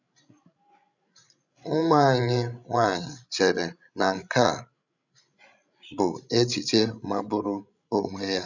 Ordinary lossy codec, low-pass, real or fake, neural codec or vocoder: none; 7.2 kHz; fake; codec, 16 kHz, 16 kbps, FreqCodec, larger model